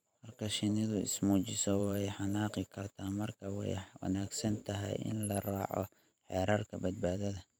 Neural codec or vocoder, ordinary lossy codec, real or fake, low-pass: vocoder, 44.1 kHz, 128 mel bands every 512 samples, BigVGAN v2; none; fake; none